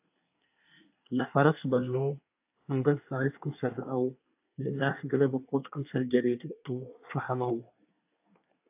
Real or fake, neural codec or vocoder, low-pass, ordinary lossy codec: fake; codec, 24 kHz, 1 kbps, SNAC; 3.6 kHz; AAC, 32 kbps